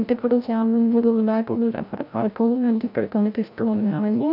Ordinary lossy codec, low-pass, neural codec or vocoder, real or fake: none; 5.4 kHz; codec, 16 kHz, 0.5 kbps, FreqCodec, larger model; fake